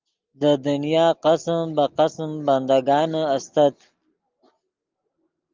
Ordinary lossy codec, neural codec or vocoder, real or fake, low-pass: Opus, 32 kbps; none; real; 7.2 kHz